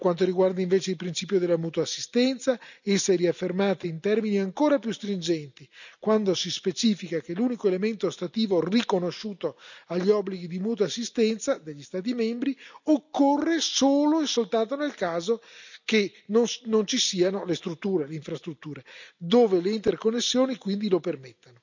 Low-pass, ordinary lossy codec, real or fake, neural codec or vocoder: 7.2 kHz; none; real; none